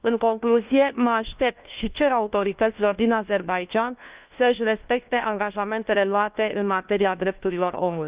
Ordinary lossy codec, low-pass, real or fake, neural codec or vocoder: Opus, 32 kbps; 3.6 kHz; fake; codec, 16 kHz, 1 kbps, FunCodec, trained on LibriTTS, 50 frames a second